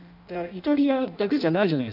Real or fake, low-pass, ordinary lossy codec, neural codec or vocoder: fake; 5.4 kHz; none; codec, 16 kHz in and 24 kHz out, 0.6 kbps, FireRedTTS-2 codec